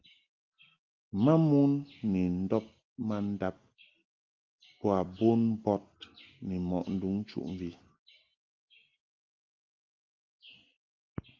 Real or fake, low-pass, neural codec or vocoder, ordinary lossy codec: real; 7.2 kHz; none; Opus, 32 kbps